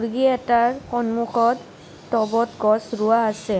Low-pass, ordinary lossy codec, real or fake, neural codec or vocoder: none; none; real; none